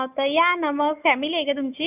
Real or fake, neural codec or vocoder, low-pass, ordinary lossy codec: fake; autoencoder, 48 kHz, 128 numbers a frame, DAC-VAE, trained on Japanese speech; 3.6 kHz; none